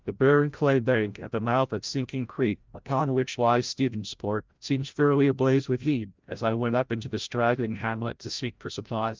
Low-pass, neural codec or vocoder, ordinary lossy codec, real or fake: 7.2 kHz; codec, 16 kHz, 0.5 kbps, FreqCodec, larger model; Opus, 24 kbps; fake